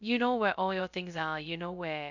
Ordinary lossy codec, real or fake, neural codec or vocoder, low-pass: none; fake; codec, 16 kHz, 0.2 kbps, FocalCodec; 7.2 kHz